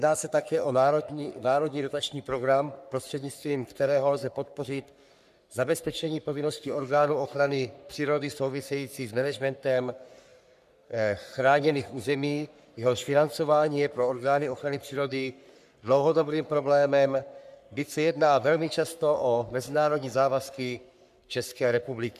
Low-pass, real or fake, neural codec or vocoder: 14.4 kHz; fake; codec, 44.1 kHz, 3.4 kbps, Pupu-Codec